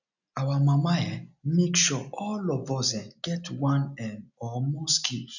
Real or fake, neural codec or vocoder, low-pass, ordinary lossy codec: real; none; 7.2 kHz; none